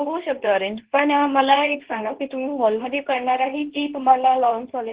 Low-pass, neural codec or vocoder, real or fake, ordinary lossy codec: 3.6 kHz; codec, 24 kHz, 0.9 kbps, WavTokenizer, medium speech release version 2; fake; Opus, 16 kbps